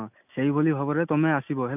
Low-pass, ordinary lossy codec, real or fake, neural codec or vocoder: 3.6 kHz; none; real; none